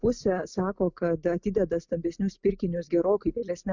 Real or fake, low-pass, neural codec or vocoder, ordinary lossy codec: real; 7.2 kHz; none; Opus, 64 kbps